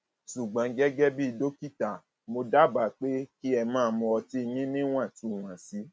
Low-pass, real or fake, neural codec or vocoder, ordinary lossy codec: none; real; none; none